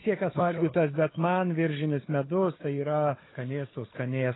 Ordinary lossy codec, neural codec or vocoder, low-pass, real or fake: AAC, 16 kbps; codec, 16 kHz, 4 kbps, FunCodec, trained on Chinese and English, 50 frames a second; 7.2 kHz; fake